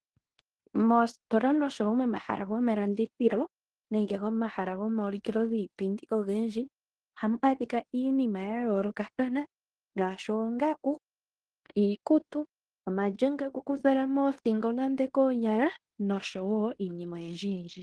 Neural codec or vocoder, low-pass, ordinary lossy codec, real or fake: codec, 16 kHz in and 24 kHz out, 0.9 kbps, LongCat-Audio-Codec, fine tuned four codebook decoder; 10.8 kHz; Opus, 16 kbps; fake